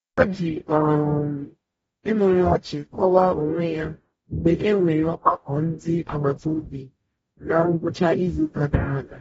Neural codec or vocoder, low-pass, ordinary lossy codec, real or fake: codec, 44.1 kHz, 0.9 kbps, DAC; 19.8 kHz; AAC, 24 kbps; fake